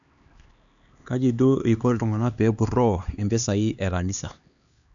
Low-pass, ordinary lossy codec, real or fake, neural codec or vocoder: 7.2 kHz; none; fake; codec, 16 kHz, 2 kbps, X-Codec, HuBERT features, trained on LibriSpeech